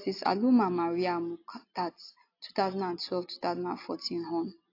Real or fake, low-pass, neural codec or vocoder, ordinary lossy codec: real; 5.4 kHz; none; AAC, 32 kbps